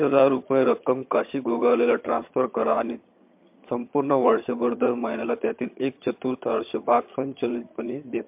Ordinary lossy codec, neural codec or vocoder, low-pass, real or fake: MP3, 32 kbps; vocoder, 22.05 kHz, 80 mel bands, HiFi-GAN; 3.6 kHz; fake